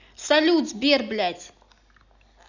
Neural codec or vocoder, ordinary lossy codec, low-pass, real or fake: none; none; 7.2 kHz; real